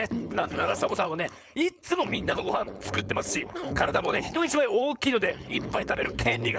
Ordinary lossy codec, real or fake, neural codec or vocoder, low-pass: none; fake; codec, 16 kHz, 4.8 kbps, FACodec; none